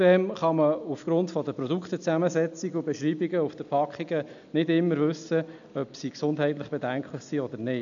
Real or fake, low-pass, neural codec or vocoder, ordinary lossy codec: real; 7.2 kHz; none; none